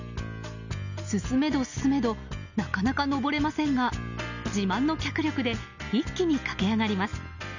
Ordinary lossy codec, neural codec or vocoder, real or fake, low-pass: none; none; real; 7.2 kHz